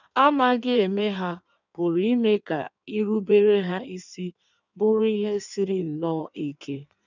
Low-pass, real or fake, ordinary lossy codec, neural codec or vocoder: 7.2 kHz; fake; none; codec, 16 kHz in and 24 kHz out, 1.1 kbps, FireRedTTS-2 codec